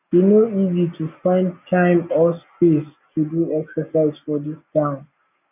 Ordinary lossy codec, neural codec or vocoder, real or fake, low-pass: none; none; real; 3.6 kHz